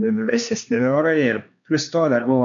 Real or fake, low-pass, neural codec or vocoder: fake; 7.2 kHz; codec, 16 kHz, 2 kbps, X-Codec, HuBERT features, trained on LibriSpeech